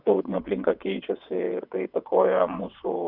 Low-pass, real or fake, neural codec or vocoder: 5.4 kHz; fake; vocoder, 22.05 kHz, 80 mel bands, WaveNeXt